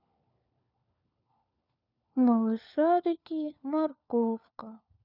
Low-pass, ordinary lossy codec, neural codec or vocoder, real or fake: 5.4 kHz; none; codec, 16 kHz, 4 kbps, FunCodec, trained on LibriTTS, 50 frames a second; fake